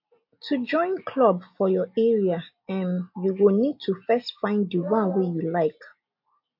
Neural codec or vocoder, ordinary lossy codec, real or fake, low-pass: none; MP3, 32 kbps; real; 5.4 kHz